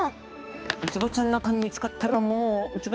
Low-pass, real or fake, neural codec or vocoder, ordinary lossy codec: none; fake; codec, 16 kHz, 2 kbps, X-Codec, HuBERT features, trained on balanced general audio; none